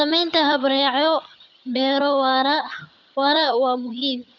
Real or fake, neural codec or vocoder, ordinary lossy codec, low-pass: fake; vocoder, 22.05 kHz, 80 mel bands, HiFi-GAN; none; 7.2 kHz